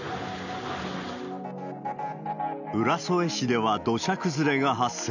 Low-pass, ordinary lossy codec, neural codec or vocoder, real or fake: 7.2 kHz; none; none; real